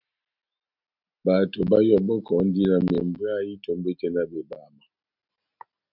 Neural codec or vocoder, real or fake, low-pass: none; real; 5.4 kHz